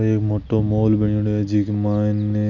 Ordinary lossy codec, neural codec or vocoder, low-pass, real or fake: none; none; 7.2 kHz; real